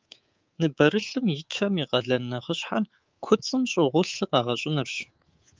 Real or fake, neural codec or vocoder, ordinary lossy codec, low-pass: fake; codec, 24 kHz, 3.1 kbps, DualCodec; Opus, 32 kbps; 7.2 kHz